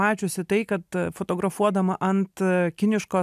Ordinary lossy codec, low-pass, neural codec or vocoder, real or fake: AAC, 96 kbps; 14.4 kHz; none; real